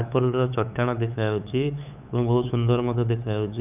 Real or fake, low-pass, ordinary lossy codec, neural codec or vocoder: fake; 3.6 kHz; none; codec, 16 kHz, 8 kbps, FunCodec, trained on LibriTTS, 25 frames a second